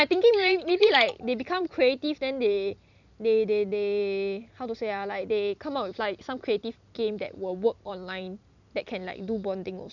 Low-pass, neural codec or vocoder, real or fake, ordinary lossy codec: 7.2 kHz; vocoder, 44.1 kHz, 128 mel bands every 256 samples, BigVGAN v2; fake; none